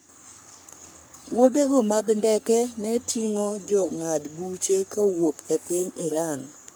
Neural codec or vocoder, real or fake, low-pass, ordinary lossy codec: codec, 44.1 kHz, 3.4 kbps, Pupu-Codec; fake; none; none